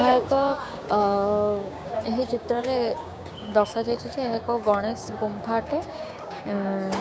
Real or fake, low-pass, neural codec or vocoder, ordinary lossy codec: fake; none; codec, 16 kHz, 6 kbps, DAC; none